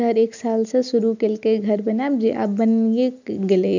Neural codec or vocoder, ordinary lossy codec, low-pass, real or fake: none; none; 7.2 kHz; real